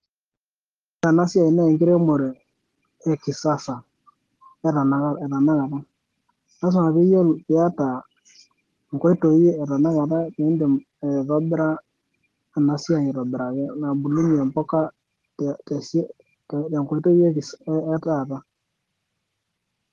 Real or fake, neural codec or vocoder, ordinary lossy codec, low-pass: real; none; Opus, 16 kbps; 14.4 kHz